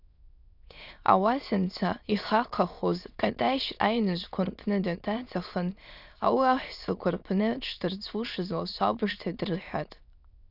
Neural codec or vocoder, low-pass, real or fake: autoencoder, 22.05 kHz, a latent of 192 numbers a frame, VITS, trained on many speakers; 5.4 kHz; fake